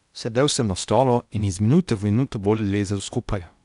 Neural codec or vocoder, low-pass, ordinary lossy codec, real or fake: codec, 16 kHz in and 24 kHz out, 0.8 kbps, FocalCodec, streaming, 65536 codes; 10.8 kHz; none; fake